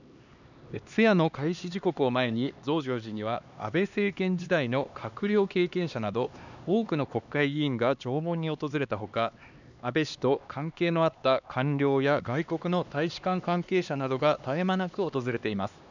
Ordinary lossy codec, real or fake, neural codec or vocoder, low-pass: none; fake; codec, 16 kHz, 2 kbps, X-Codec, HuBERT features, trained on LibriSpeech; 7.2 kHz